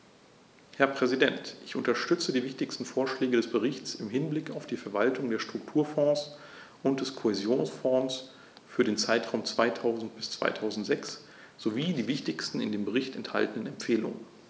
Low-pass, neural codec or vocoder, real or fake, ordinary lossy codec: none; none; real; none